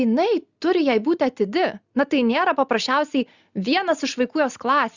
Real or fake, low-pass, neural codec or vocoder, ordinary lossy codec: real; 7.2 kHz; none; Opus, 64 kbps